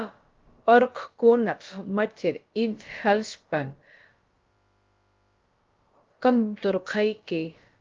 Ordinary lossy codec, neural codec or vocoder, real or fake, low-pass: Opus, 32 kbps; codec, 16 kHz, about 1 kbps, DyCAST, with the encoder's durations; fake; 7.2 kHz